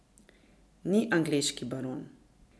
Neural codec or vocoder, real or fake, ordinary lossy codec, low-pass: none; real; none; none